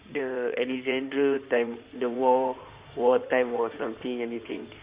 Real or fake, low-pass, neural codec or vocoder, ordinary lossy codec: fake; 3.6 kHz; codec, 16 kHz in and 24 kHz out, 2.2 kbps, FireRedTTS-2 codec; Opus, 64 kbps